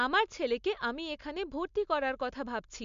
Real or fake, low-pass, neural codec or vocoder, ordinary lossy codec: real; 7.2 kHz; none; MP3, 64 kbps